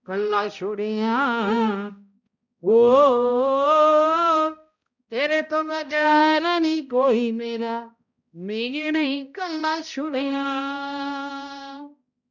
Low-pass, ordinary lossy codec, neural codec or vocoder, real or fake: 7.2 kHz; none; codec, 16 kHz, 0.5 kbps, X-Codec, HuBERT features, trained on balanced general audio; fake